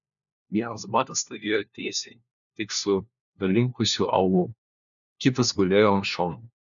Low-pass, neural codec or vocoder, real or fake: 7.2 kHz; codec, 16 kHz, 1 kbps, FunCodec, trained on LibriTTS, 50 frames a second; fake